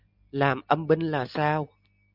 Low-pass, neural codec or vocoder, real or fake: 5.4 kHz; none; real